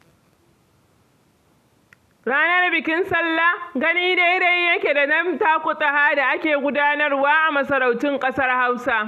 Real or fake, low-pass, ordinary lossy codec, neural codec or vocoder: real; 14.4 kHz; none; none